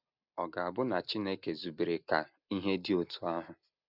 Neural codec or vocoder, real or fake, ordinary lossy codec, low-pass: none; real; none; 5.4 kHz